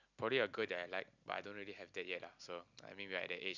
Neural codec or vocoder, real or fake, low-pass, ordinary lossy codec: none; real; 7.2 kHz; none